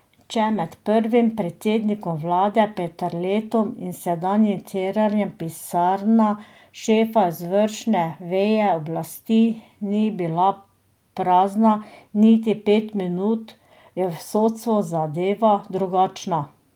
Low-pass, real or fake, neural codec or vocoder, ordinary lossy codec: 19.8 kHz; real; none; Opus, 32 kbps